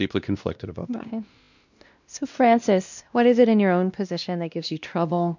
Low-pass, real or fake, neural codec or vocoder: 7.2 kHz; fake; codec, 16 kHz, 1 kbps, X-Codec, WavLM features, trained on Multilingual LibriSpeech